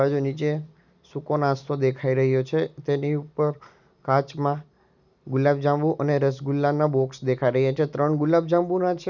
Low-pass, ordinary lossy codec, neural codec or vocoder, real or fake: 7.2 kHz; none; none; real